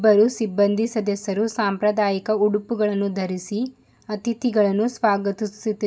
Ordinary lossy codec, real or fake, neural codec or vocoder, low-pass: none; real; none; none